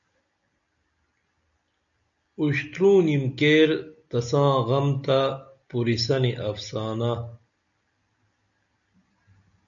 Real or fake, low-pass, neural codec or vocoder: real; 7.2 kHz; none